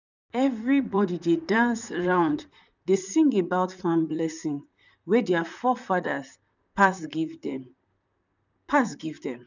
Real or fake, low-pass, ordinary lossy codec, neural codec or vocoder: fake; 7.2 kHz; none; vocoder, 44.1 kHz, 128 mel bands, Pupu-Vocoder